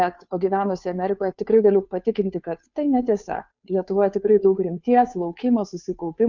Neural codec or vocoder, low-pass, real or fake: codec, 16 kHz, 2 kbps, FunCodec, trained on Chinese and English, 25 frames a second; 7.2 kHz; fake